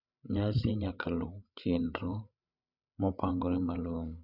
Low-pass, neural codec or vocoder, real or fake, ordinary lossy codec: 5.4 kHz; codec, 16 kHz, 8 kbps, FreqCodec, larger model; fake; none